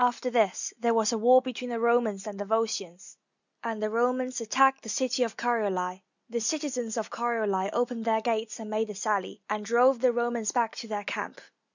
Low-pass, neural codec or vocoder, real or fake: 7.2 kHz; none; real